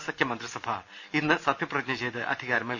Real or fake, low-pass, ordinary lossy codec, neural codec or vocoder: real; 7.2 kHz; none; none